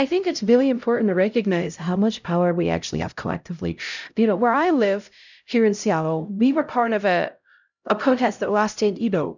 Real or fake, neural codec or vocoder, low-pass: fake; codec, 16 kHz, 0.5 kbps, X-Codec, HuBERT features, trained on LibriSpeech; 7.2 kHz